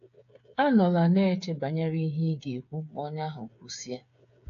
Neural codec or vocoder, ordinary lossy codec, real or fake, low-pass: codec, 16 kHz, 8 kbps, FreqCodec, smaller model; AAC, 48 kbps; fake; 7.2 kHz